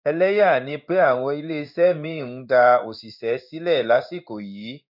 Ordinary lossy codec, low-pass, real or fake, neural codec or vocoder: none; 5.4 kHz; fake; codec, 16 kHz in and 24 kHz out, 1 kbps, XY-Tokenizer